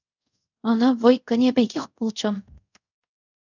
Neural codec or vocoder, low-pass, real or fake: codec, 24 kHz, 0.5 kbps, DualCodec; 7.2 kHz; fake